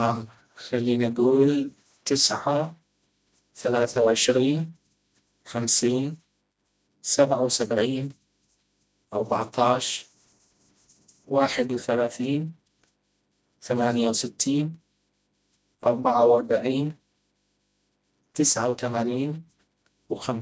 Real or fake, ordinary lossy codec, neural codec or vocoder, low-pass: fake; none; codec, 16 kHz, 1 kbps, FreqCodec, smaller model; none